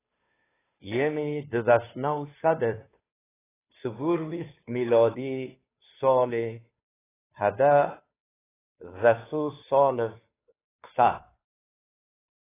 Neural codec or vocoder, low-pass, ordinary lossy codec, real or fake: codec, 16 kHz, 2 kbps, FunCodec, trained on Chinese and English, 25 frames a second; 3.6 kHz; AAC, 16 kbps; fake